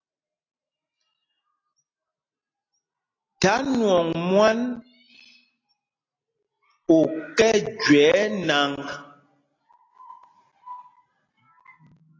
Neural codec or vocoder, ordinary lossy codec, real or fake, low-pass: none; AAC, 32 kbps; real; 7.2 kHz